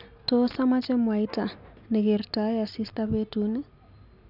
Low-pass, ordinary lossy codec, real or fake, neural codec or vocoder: 5.4 kHz; none; real; none